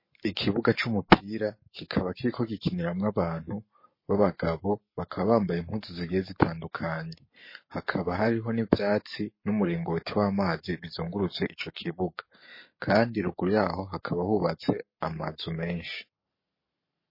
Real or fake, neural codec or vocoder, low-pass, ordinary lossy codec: fake; codec, 44.1 kHz, 7.8 kbps, Pupu-Codec; 5.4 kHz; MP3, 24 kbps